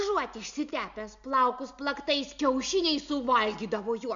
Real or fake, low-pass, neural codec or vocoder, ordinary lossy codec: real; 7.2 kHz; none; MP3, 64 kbps